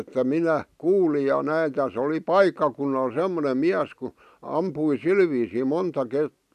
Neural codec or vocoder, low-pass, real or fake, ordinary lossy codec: none; 14.4 kHz; real; none